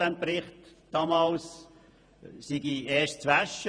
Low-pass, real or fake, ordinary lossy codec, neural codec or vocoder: 9.9 kHz; fake; none; vocoder, 48 kHz, 128 mel bands, Vocos